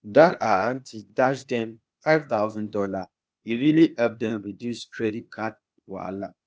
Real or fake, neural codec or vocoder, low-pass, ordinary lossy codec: fake; codec, 16 kHz, 0.8 kbps, ZipCodec; none; none